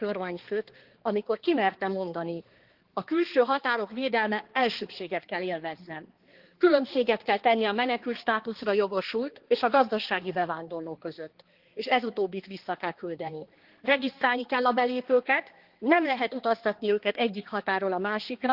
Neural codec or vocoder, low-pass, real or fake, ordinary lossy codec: codec, 16 kHz, 2 kbps, X-Codec, HuBERT features, trained on balanced general audio; 5.4 kHz; fake; Opus, 16 kbps